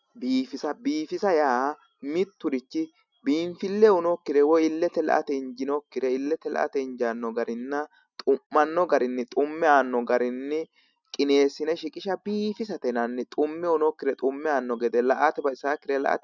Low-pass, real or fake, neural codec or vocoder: 7.2 kHz; real; none